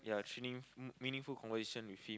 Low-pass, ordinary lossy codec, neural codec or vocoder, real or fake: none; none; none; real